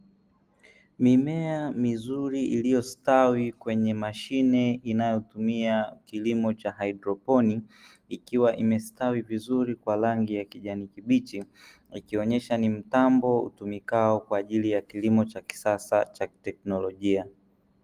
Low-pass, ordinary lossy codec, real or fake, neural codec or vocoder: 14.4 kHz; Opus, 24 kbps; real; none